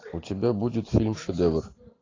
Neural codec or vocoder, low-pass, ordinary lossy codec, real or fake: none; 7.2 kHz; AAC, 32 kbps; real